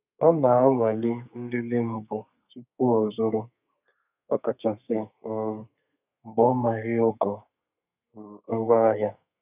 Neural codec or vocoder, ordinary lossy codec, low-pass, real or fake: codec, 32 kHz, 1.9 kbps, SNAC; none; 3.6 kHz; fake